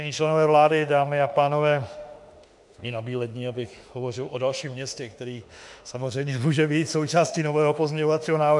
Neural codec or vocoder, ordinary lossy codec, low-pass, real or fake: autoencoder, 48 kHz, 32 numbers a frame, DAC-VAE, trained on Japanese speech; MP3, 96 kbps; 10.8 kHz; fake